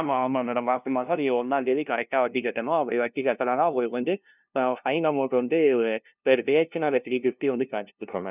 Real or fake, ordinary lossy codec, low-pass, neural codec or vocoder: fake; none; 3.6 kHz; codec, 16 kHz, 0.5 kbps, FunCodec, trained on LibriTTS, 25 frames a second